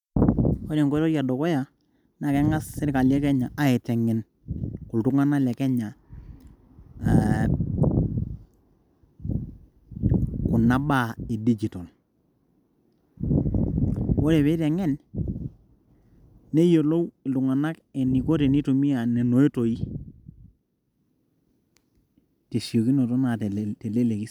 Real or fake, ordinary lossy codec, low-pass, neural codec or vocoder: real; none; 19.8 kHz; none